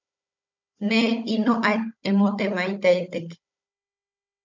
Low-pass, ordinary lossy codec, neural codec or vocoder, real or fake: 7.2 kHz; MP3, 64 kbps; codec, 16 kHz, 16 kbps, FunCodec, trained on Chinese and English, 50 frames a second; fake